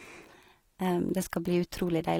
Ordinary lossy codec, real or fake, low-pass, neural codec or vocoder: AAC, 48 kbps; real; 19.8 kHz; none